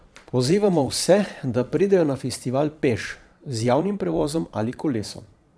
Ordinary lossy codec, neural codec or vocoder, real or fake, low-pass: none; vocoder, 22.05 kHz, 80 mel bands, Vocos; fake; none